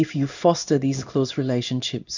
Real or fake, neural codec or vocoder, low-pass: fake; codec, 16 kHz in and 24 kHz out, 1 kbps, XY-Tokenizer; 7.2 kHz